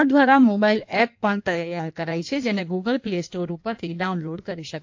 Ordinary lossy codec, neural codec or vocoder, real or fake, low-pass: AAC, 48 kbps; codec, 16 kHz in and 24 kHz out, 1.1 kbps, FireRedTTS-2 codec; fake; 7.2 kHz